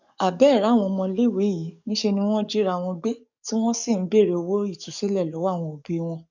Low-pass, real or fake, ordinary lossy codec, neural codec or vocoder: 7.2 kHz; fake; none; codec, 44.1 kHz, 7.8 kbps, DAC